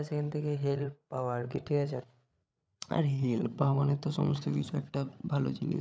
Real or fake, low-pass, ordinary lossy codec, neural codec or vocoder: fake; none; none; codec, 16 kHz, 8 kbps, FreqCodec, larger model